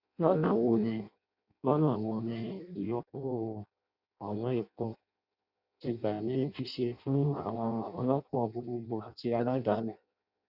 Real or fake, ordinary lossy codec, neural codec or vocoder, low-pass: fake; none; codec, 16 kHz in and 24 kHz out, 0.6 kbps, FireRedTTS-2 codec; 5.4 kHz